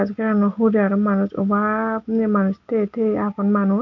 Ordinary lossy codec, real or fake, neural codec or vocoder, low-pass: none; real; none; 7.2 kHz